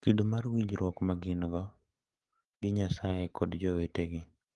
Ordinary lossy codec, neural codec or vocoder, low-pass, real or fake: Opus, 24 kbps; codec, 44.1 kHz, 7.8 kbps, DAC; 10.8 kHz; fake